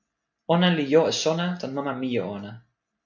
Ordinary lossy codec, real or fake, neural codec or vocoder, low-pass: MP3, 48 kbps; real; none; 7.2 kHz